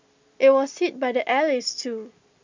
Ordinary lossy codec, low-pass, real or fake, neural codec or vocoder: MP3, 64 kbps; 7.2 kHz; real; none